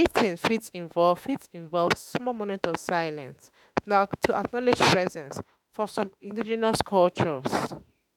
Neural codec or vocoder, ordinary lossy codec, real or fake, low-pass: autoencoder, 48 kHz, 32 numbers a frame, DAC-VAE, trained on Japanese speech; none; fake; 19.8 kHz